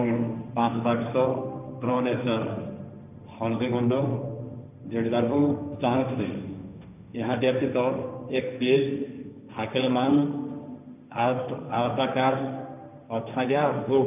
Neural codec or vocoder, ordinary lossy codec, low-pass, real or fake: codec, 16 kHz, 1.1 kbps, Voila-Tokenizer; none; 3.6 kHz; fake